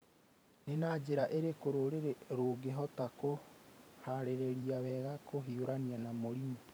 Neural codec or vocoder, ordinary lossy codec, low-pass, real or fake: vocoder, 44.1 kHz, 128 mel bands every 512 samples, BigVGAN v2; none; none; fake